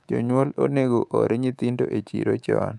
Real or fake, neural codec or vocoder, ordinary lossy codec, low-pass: fake; codec, 24 kHz, 3.1 kbps, DualCodec; none; none